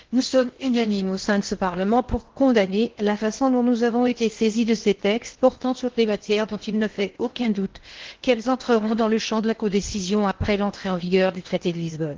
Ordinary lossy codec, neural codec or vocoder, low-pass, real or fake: Opus, 16 kbps; codec, 16 kHz in and 24 kHz out, 0.8 kbps, FocalCodec, streaming, 65536 codes; 7.2 kHz; fake